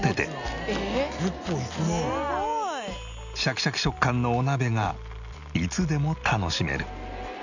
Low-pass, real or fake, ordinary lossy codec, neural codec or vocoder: 7.2 kHz; real; none; none